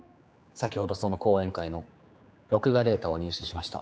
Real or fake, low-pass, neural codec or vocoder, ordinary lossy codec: fake; none; codec, 16 kHz, 4 kbps, X-Codec, HuBERT features, trained on general audio; none